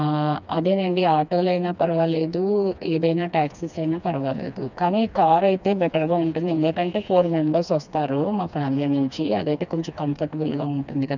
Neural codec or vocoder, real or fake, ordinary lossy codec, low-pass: codec, 16 kHz, 2 kbps, FreqCodec, smaller model; fake; none; 7.2 kHz